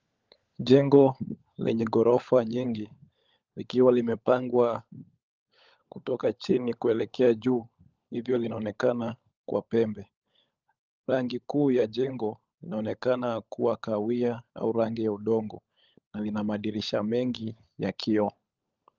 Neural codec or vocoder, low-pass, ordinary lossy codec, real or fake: codec, 16 kHz, 16 kbps, FunCodec, trained on LibriTTS, 50 frames a second; 7.2 kHz; Opus, 24 kbps; fake